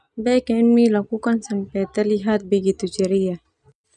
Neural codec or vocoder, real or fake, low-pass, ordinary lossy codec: none; real; 10.8 kHz; none